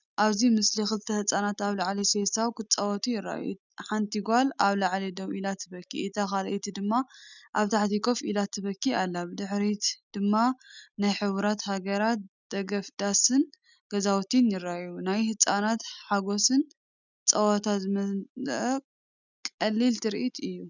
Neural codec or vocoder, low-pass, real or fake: none; 7.2 kHz; real